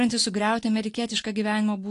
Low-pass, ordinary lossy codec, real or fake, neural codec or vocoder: 10.8 kHz; AAC, 48 kbps; real; none